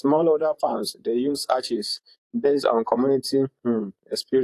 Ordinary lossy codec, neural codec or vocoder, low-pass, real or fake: AAC, 64 kbps; vocoder, 44.1 kHz, 128 mel bands, Pupu-Vocoder; 14.4 kHz; fake